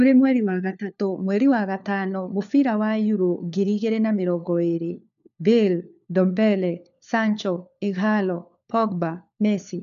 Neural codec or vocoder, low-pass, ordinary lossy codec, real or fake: codec, 16 kHz, 4 kbps, FunCodec, trained on LibriTTS, 50 frames a second; 7.2 kHz; none; fake